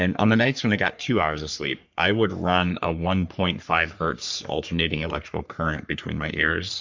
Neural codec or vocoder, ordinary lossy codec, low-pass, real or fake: codec, 44.1 kHz, 3.4 kbps, Pupu-Codec; MP3, 64 kbps; 7.2 kHz; fake